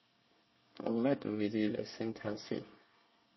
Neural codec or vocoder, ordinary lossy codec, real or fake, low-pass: codec, 24 kHz, 1 kbps, SNAC; MP3, 24 kbps; fake; 7.2 kHz